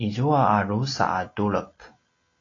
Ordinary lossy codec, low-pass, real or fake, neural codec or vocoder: AAC, 32 kbps; 7.2 kHz; real; none